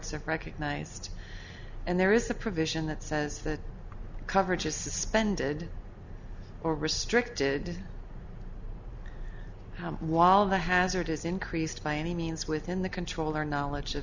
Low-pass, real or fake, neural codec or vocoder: 7.2 kHz; real; none